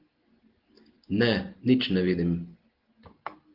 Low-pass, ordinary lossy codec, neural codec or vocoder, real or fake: 5.4 kHz; Opus, 16 kbps; none; real